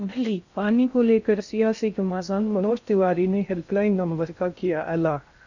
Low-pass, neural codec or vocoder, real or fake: 7.2 kHz; codec, 16 kHz in and 24 kHz out, 0.6 kbps, FocalCodec, streaming, 2048 codes; fake